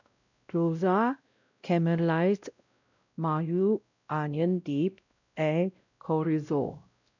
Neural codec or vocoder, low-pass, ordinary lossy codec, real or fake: codec, 16 kHz, 0.5 kbps, X-Codec, WavLM features, trained on Multilingual LibriSpeech; 7.2 kHz; none; fake